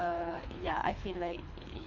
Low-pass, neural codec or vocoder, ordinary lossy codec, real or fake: 7.2 kHz; codec, 24 kHz, 3 kbps, HILCodec; none; fake